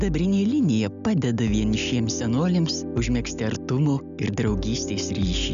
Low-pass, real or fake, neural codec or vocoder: 7.2 kHz; real; none